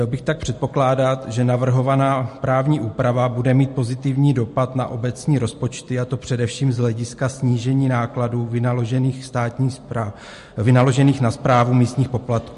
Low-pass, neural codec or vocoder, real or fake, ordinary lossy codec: 14.4 kHz; none; real; MP3, 48 kbps